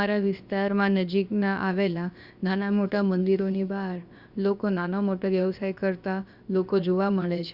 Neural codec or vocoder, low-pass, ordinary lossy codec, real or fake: codec, 16 kHz, about 1 kbps, DyCAST, with the encoder's durations; 5.4 kHz; Opus, 64 kbps; fake